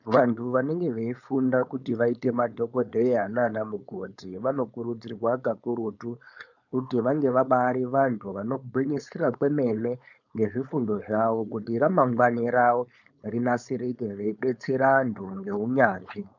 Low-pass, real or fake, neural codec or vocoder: 7.2 kHz; fake; codec, 16 kHz, 4.8 kbps, FACodec